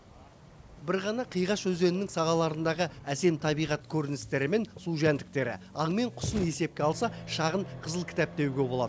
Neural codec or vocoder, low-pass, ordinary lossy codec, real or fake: none; none; none; real